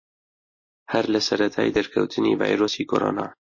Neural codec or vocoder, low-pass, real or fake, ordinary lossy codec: none; 7.2 kHz; real; MP3, 32 kbps